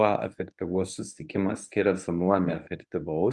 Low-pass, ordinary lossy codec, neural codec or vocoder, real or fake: 10.8 kHz; Opus, 32 kbps; codec, 24 kHz, 0.9 kbps, WavTokenizer, medium speech release version 1; fake